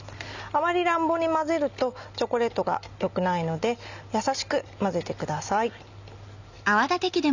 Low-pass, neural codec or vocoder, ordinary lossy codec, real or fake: 7.2 kHz; none; none; real